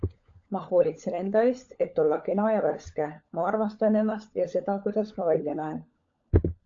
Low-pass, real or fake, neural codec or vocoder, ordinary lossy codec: 7.2 kHz; fake; codec, 16 kHz, 16 kbps, FunCodec, trained on LibriTTS, 50 frames a second; AAC, 48 kbps